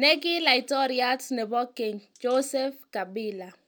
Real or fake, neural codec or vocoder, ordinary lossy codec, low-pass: real; none; none; none